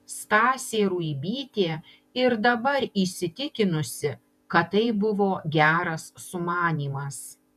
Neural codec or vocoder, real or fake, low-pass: vocoder, 48 kHz, 128 mel bands, Vocos; fake; 14.4 kHz